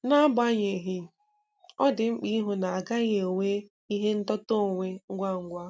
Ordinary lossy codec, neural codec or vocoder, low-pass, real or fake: none; none; none; real